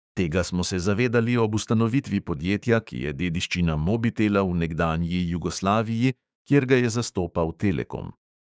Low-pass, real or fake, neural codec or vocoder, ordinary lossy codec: none; fake; codec, 16 kHz, 6 kbps, DAC; none